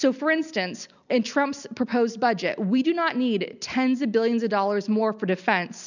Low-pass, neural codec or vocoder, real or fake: 7.2 kHz; none; real